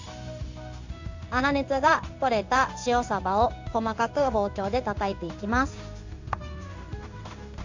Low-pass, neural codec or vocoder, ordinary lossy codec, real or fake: 7.2 kHz; codec, 16 kHz in and 24 kHz out, 1 kbps, XY-Tokenizer; AAC, 48 kbps; fake